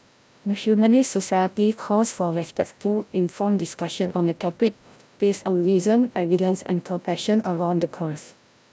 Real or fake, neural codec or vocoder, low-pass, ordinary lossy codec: fake; codec, 16 kHz, 0.5 kbps, FreqCodec, larger model; none; none